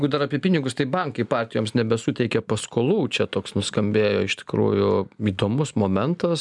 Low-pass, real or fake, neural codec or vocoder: 10.8 kHz; real; none